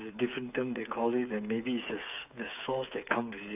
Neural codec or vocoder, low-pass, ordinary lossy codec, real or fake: codec, 16 kHz, 8 kbps, FreqCodec, smaller model; 3.6 kHz; AAC, 32 kbps; fake